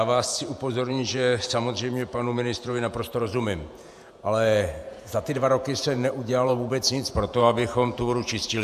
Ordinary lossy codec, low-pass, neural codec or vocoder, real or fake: AAC, 96 kbps; 14.4 kHz; vocoder, 48 kHz, 128 mel bands, Vocos; fake